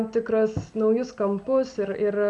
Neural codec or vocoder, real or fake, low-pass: none; real; 10.8 kHz